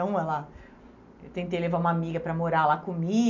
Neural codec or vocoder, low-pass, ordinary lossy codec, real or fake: none; 7.2 kHz; none; real